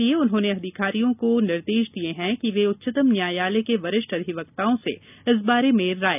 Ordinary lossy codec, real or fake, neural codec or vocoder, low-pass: none; real; none; 3.6 kHz